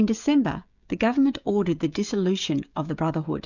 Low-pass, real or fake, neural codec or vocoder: 7.2 kHz; fake; codec, 16 kHz, 16 kbps, FreqCodec, smaller model